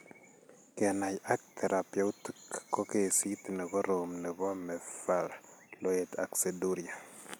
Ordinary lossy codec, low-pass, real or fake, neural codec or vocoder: none; none; real; none